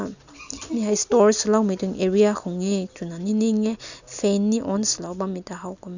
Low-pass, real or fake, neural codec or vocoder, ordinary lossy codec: 7.2 kHz; real; none; none